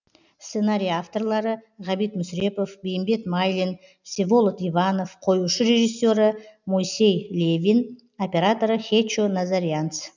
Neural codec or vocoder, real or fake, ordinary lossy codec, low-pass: none; real; none; 7.2 kHz